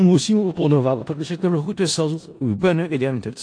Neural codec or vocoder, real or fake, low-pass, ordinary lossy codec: codec, 16 kHz in and 24 kHz out, 0.4 kbps, LongCat-Audio-Codec, four codebook decoder; fake; 9.9 kHz; AAC, 48 kbps